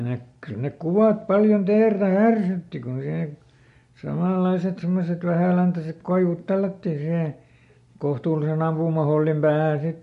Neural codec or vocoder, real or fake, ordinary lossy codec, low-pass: none; real; MP3, 48 kbps; 14.4 kHz